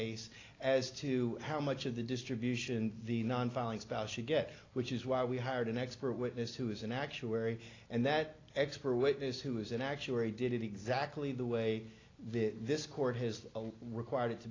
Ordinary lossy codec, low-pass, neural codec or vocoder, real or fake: AAC, 32 kbps; 7.2 kHz; none; real